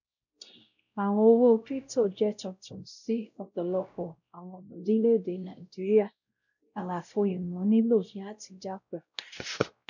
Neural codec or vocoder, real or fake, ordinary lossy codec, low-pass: codec, 16 kHz, 0.5 kbps, X-Codec, WavLM features, trained on Multilingual LibriSpeech; fake; none; 7.2 kHz